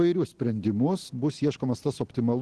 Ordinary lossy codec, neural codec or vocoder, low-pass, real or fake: Opus, 16 kbps; none; 10.8 kHz; real